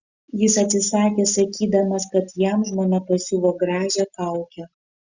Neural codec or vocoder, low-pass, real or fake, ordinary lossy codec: none; 7.2 kHz; real; Opus, 64 kbps